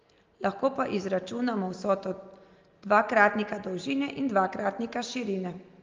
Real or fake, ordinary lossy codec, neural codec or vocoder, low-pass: real; Opus, 24 kbps; none; 7.2 kHz